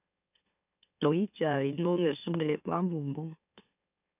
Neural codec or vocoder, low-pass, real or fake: autoencoder, 44.1 kHz, a latent of 192 numbers a frame, MeloTTS; 3.6 kHz; fake